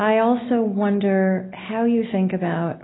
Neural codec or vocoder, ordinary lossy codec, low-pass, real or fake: none; AAC, 16 kbps; 7.2 kHz; real